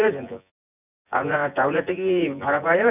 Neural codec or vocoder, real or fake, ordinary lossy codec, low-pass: vocoder, 24 kHz, 100 mel bands, Vocos; fake; none; 3.6 kHz